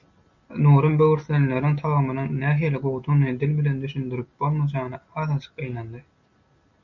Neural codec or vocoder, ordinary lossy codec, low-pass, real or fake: none; MP3, 64 kbps; 7.2 kHz; real